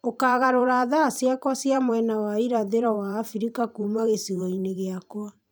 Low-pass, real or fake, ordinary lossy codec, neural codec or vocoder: none; fake; none; vocoder, 44.1 kHz, 128 mel bands every 512 samples, BigVGAN v2